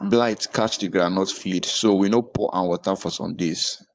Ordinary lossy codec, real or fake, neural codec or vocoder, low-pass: none; fake; codec, 16 kHz, 4.8 kbps, FACodec; none